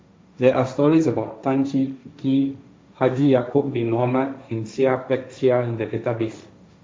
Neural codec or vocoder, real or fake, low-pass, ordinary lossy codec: codec, 16 kHz, 1.1 kbps, Voila-Tokenizer; fake; none; none